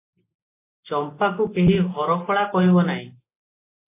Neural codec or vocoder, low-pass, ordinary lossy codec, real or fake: none; 3.6 kHz; AAC, 24 kbps; real